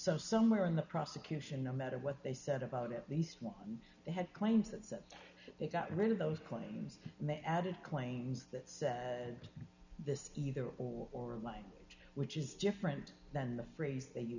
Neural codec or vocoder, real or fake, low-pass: none; real; 7.2 kHz